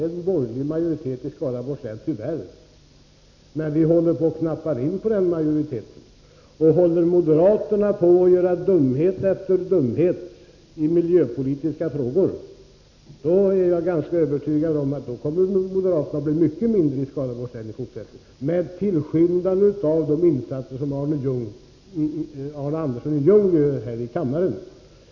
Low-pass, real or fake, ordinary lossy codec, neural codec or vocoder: 7.2 kHz; real; none; none